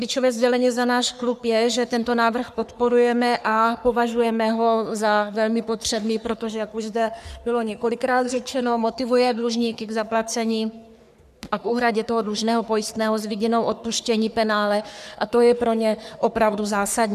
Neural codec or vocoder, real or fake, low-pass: codec, 44.1 kHz, 3.4 kbps, Pupu-Codec; fake; 14.4 kHz